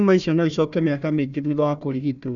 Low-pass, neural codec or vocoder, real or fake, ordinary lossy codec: 7.2 kHz; codec, 16 kHz, 1 kbps, FunCodec, trained on Chinese and English, 50 frames a second; fake; none